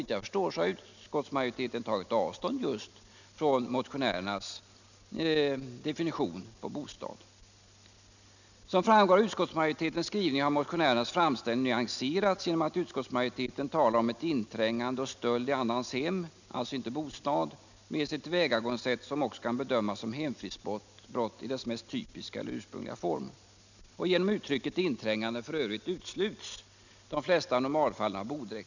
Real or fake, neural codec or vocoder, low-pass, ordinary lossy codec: real; none; 7.2 kHz; none